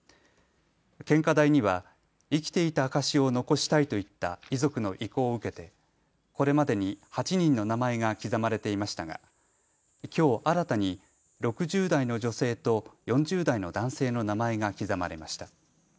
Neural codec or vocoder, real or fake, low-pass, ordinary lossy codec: none; real; none; none